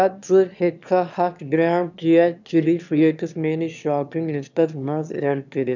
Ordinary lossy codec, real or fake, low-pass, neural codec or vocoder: none; fake; 7.2 kHz; autoencoder, 22.05 kHz, a latent of 192 numbers a frame, VITS, trained on one speaker